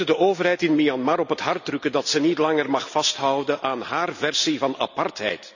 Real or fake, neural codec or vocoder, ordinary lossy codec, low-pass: real; none; none; 7.2 kHz